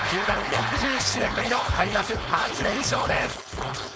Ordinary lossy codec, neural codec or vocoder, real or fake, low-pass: none; codec, 16 kHz, 4.8 kbps, FACodec; fake; none